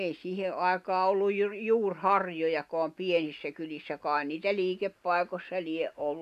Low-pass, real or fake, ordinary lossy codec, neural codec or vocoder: 14.4 kHz; real; none; none